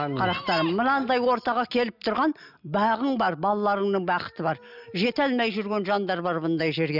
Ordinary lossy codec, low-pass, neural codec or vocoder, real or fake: none; 5.4 kHz; none; real